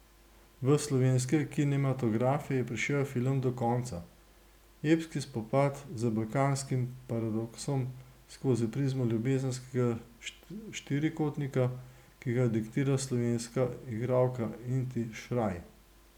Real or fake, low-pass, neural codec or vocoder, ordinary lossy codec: real; 19.8 kHz; none; none